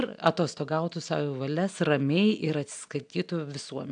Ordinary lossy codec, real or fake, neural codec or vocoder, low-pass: AAC, 64 kbps; real; none; 9.9 kHz